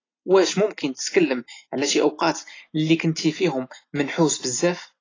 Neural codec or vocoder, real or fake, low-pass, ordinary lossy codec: none; real; 7.2 kHz; AAC, 32 kbps